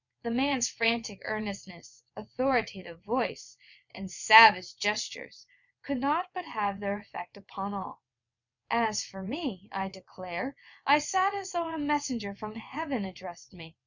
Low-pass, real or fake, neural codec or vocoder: 7.2 kHz; fake; vocoder, 22.05 kHz, 80 mel bands, WaveNeXt